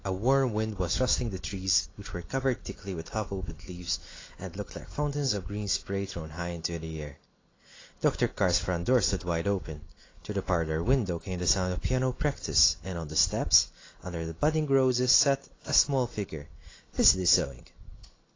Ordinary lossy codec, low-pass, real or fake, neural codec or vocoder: AAC, 32 kbps; 7.2 kHz; real; none